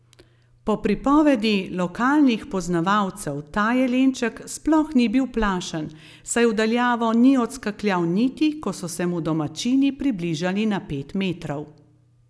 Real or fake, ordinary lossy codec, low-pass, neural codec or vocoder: real; none; none; none